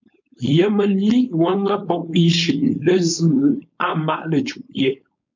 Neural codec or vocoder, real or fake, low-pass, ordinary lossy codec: codec, 16 kHz, 4.8 kbps, FACodec; fake; 7.2 kHz; MP3, 48 kbps